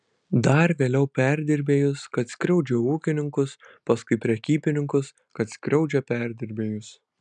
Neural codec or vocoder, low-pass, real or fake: none; 9.9 kHz; real